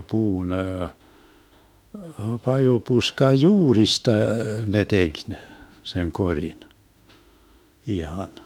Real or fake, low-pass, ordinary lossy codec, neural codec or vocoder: fake; 19.8 kHz; none; autoencoder, 48 kHz, 32 numbers a frame, DAC-VAE, trained on Japanese speech